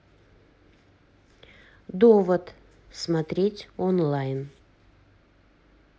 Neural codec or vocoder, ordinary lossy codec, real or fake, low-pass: none; none; real; none